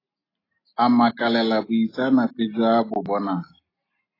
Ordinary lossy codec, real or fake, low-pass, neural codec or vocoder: AAC, 24 kbps; real; 5.4 kHz; none